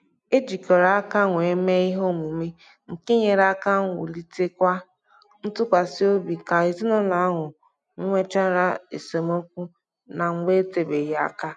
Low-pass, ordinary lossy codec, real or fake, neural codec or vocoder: 9.9 kHz; none; real; none